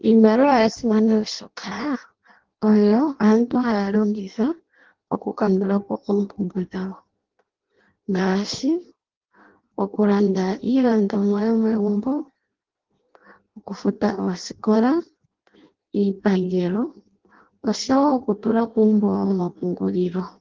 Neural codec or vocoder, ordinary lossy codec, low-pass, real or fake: codec, 16 kHz in and 24 kHz out, 0.6 kbps, FireRedTTS-2 codec; Opus, 16 kbps; 7.2 kHz; fake